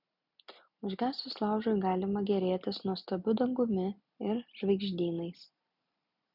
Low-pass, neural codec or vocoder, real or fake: 5.4 kHz; none; real